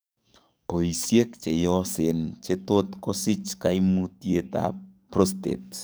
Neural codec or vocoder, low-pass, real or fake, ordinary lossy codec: codec, 44.1 kHz, 7.8 kbps, DAC; none; fake; none